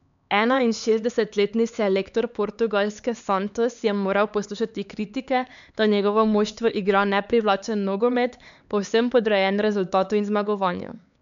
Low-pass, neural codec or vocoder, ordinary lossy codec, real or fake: 7.2 kHz; codec, 16 kHz, 4 kbps, X-Codec, HuBERT features, trained on LibriSpeech; none; fake